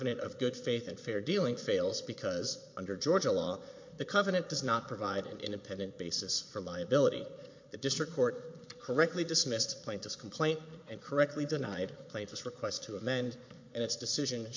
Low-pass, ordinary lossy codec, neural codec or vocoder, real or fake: 7.2 kHz; MP3, 48 kbps; vocoder, 22.05 kHz, 80 mel bands, Vocos; fake